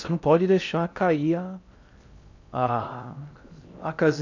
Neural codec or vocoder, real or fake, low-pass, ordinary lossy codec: codec, 16 kHz in and 24 kHz out, 0.6 kbps, FocalCodec, streaming, 4096 codes; fake; 7.2 kHz; none